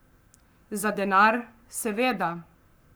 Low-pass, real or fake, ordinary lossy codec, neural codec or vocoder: none; fake; none; codec, 44.1 kHz, 7.8 kbps, Pupu-Codec